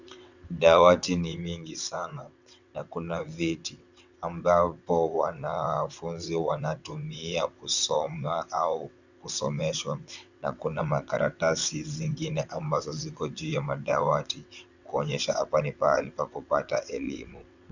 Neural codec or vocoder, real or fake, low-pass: vocoder, 22.05 kHz, 80 mel bands, WaveNeXt; fake; 7.2 kHz